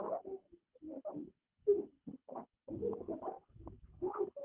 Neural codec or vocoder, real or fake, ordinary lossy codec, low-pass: codec, 24 kHz, 6 kbps, HILCodec; fake; Opus, 24 kbps; 3.6 kHz